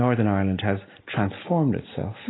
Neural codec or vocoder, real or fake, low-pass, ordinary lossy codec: none; real; 7.2 kHz; AAC, 16 kbps